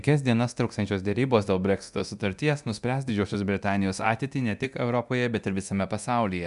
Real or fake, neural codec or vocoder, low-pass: fake; codec, 24 kHz, 0.9 kbps, DualCodec; 10.8 kHz